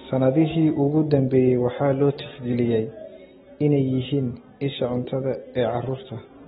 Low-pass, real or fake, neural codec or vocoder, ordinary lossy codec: 19.8 kHz; real; none; AAC, 16 kbps